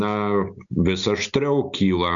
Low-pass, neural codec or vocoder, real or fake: 7.2 kHz; none; real